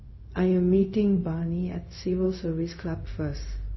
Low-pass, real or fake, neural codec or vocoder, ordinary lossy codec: 7.2 kHz; fake; codec, 16 kHz, 0.4 kbps, LongCat-Audio-Codec; MP3, 24 kbps